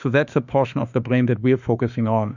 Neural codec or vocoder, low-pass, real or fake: autoencoder, 48 kHz, 32 numbers a frame, DAC-VAE, trained on Japanese speech; 7.2 kHz; fake